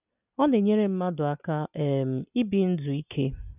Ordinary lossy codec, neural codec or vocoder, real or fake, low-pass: none; none; real; 3.6 kHz